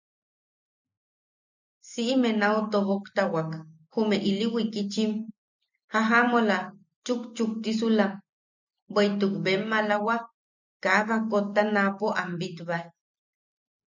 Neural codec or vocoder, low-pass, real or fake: none; 7.2 kHz; real